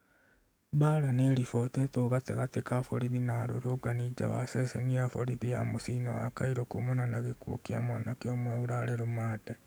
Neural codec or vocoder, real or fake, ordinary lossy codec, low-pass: codec, 44.1 kHz, 7.8 kbps, DAC; fake; none; none